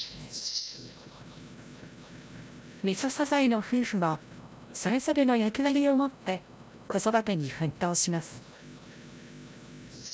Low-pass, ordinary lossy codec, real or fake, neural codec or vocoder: none; none; fake; codec, 16 kHz, 0.5 kbps, FreqCodec, larger model